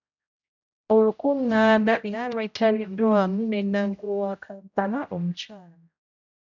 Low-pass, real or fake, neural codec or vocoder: 7.2 kHz; fake; codec, 16 kHz, 0.5 kbps, X-Codec, HuBERT features, trained on general audio